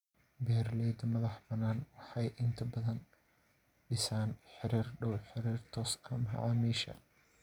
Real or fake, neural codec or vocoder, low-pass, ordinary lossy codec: real; none; 19.8 kHz; none